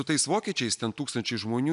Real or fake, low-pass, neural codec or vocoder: real; 10.8 kHz; none